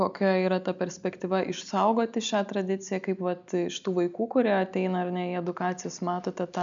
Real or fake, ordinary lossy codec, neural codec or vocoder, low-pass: real; MP3, 96 kbps; none; 7.2 kHz